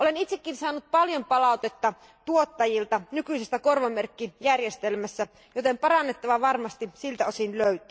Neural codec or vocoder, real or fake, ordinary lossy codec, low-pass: none; real; none; none